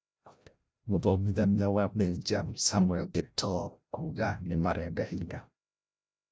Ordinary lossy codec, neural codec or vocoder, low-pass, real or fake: none; codec, 16 kHz, 0.5 kbps, FreqCodec, larger model; none; fake